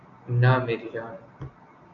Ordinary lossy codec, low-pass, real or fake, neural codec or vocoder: AAC, 64 kbps; 7.2 kHz; real; none